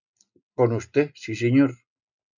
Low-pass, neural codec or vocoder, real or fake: 7.2 kHz; none; real